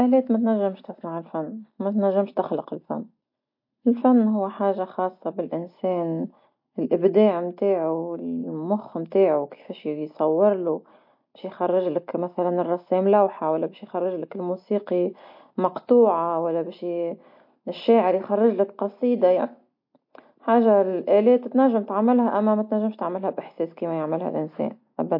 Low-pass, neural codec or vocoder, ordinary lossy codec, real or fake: 5.4 kHz; none; MP3, 32 kbps; real